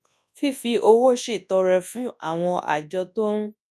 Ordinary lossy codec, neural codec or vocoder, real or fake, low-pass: none; codec, 24 kHz, 0.9 kbps, WavTokenizer, large speech release; fake; none